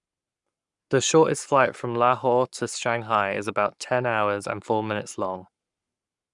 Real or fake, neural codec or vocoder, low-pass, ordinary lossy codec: fake; codec, 44.1 kHz, 7.8 kbps, Pupu-Codec; 10.8 kHz; none